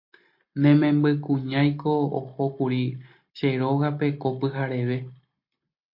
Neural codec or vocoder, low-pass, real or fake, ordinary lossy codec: none; 5.4 kHz; real; MP3, 32 kbps